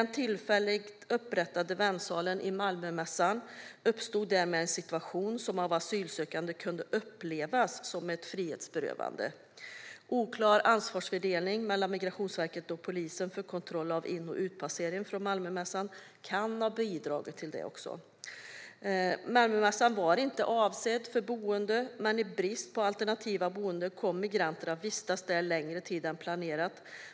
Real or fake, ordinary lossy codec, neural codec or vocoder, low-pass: real; none; none; none